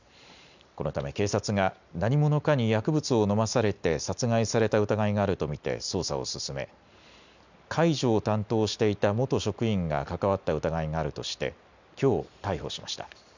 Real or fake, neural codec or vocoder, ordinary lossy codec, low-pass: real; none; none; 7.2 kHz